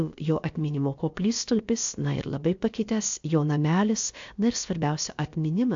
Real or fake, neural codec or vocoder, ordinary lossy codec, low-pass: fake; codec, 16 kHz, about 1 kbps, DyCAST, with the encoder's durations; AAC, 64 kbps; 7.2 kHz